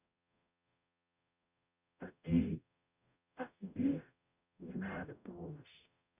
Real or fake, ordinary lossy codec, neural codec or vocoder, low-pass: fake; none; codec, 44.1 kHz, 0.9 kbps, DAC; 3.6 kHz